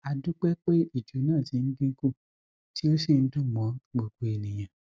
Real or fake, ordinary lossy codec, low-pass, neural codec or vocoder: real; none; none; none